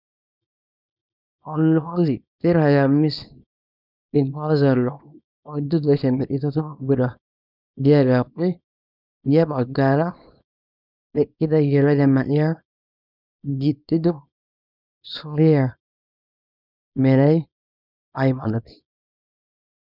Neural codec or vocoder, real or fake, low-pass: codec, 24 kHz, 0.9 kbps, WavTokenizer, small release; fake; 5.4 kHz